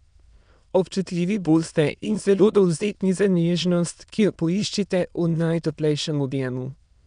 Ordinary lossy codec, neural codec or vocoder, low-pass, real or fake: none; autoencoder, 22.05 kHz, a latent of 192 numbers a frame, VITS, trained on many speakers; 9.9 kHz; fake